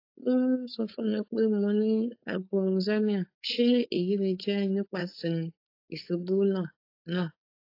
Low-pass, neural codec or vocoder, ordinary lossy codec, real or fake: 5.4 kHz; codec, 16 kHz, 4.8 kbps, FACodec; AAC, 32 kbps; fake